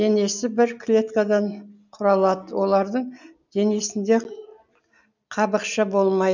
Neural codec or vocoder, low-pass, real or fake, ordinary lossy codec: none; 7.2 kHz; real; none